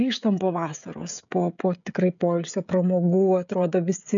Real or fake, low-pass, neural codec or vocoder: fake; 7.2 kHz; codec, 16 kHz, 16 kbps, FreqCodec, smaller model